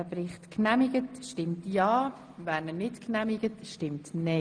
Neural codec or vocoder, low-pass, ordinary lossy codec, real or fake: none; 9.9 kHz; Opus, 24 kbps; real